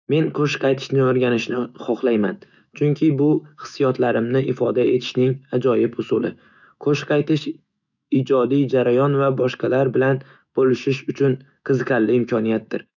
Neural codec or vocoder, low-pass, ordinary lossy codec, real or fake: autoencoder, 48 kHz, 128 numbers a frame, DAC-VAE, trained on Japanese speech; 7.2 kHz; none; fake